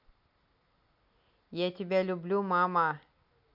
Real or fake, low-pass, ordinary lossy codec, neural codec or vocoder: real; 5.4 kHz; none; none